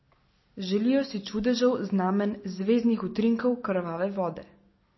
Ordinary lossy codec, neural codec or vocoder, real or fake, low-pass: MP3, 24 kbps; none; real; 7.2 kHz